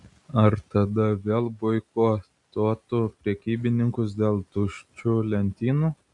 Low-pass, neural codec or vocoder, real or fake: 10.8 kHz; none; real